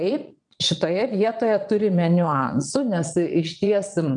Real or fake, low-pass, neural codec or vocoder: fake; 9.9 kHz; vocoder, 22.05 kHz, 80 mel bands, Vocos